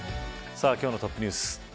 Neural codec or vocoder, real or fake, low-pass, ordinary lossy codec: none; real; none; none